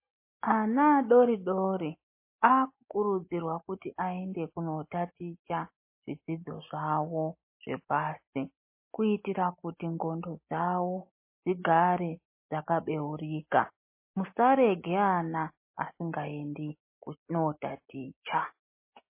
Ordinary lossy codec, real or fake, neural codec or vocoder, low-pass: MP3, 24 kbps; real; none; 3.6 kHz